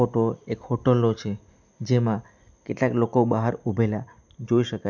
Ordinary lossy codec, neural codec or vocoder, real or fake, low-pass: none; none; real; 7.2 kHz